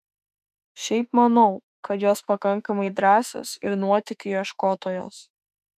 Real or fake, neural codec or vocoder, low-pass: fake; autoencoder, 48 kHz, 32 numbers a frame, DAC-VAE, trained on Japanese speech; 14.4 kHz